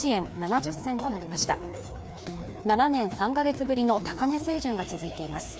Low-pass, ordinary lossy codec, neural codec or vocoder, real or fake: none; none; codec, 16 kHz, 2 kbps, FreqCodec, larger model; fake